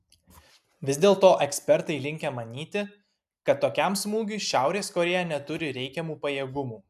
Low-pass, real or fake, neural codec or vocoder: 14.4 kHz; real; none